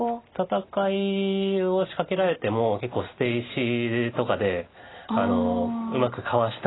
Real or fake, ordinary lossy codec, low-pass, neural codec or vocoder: real; AAC, 16 kbps; 7.2 kHz; none